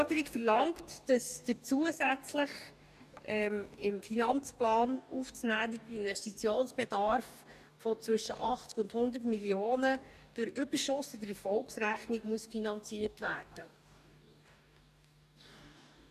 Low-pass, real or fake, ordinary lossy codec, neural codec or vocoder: 14.4 kHz; fake; none; codec, 44.1 kHz, 2.6 kbps, DAC